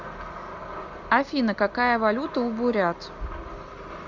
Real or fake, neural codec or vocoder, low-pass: real; none; 7.2 kHz